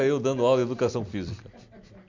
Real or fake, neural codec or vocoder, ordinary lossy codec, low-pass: real; none; MP3, 48 kbps; 7.2 kHz